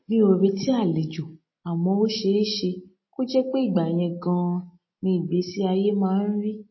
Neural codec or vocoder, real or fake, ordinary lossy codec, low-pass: none; real; MP3, 24 kbps; 7.2 kHz